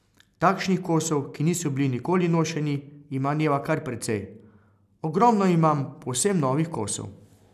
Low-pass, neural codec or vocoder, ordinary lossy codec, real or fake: 14.4 kHz; none; none; real